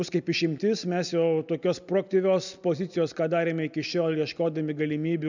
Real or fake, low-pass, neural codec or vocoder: real; 7.2 kHz; none